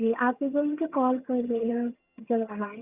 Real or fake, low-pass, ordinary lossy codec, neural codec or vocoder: fake; 3.6 kHz; Opus, 64 kbps; vocoder, 22.05 kHz, 80 mel bands, HiFi-GAN